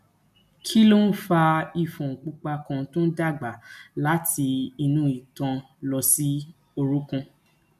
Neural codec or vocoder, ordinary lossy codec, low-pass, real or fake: none; none; 14.4 kHz; real